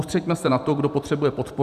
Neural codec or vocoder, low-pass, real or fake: vocoder, 44.1 kHz, 128 mel bands every 256 samples, BigVGAN v2; 14.4 kHz; fake